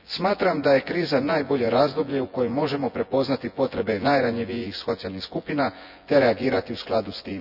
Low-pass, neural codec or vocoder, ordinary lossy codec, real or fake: 5.4 kHz; vocoder, 24 kHz, 100 mel bands, Vocos; none; fake